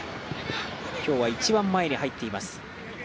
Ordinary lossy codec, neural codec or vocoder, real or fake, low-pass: none; none; real; none